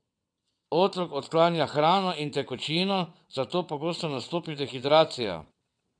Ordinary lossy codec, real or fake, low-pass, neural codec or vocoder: none; fake; 9.9 kHz; vocoder, 22.05 kHz, 80 mel bands, WaveNeXt